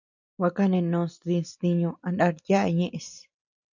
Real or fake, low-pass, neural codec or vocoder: real; 7.2 kHz; none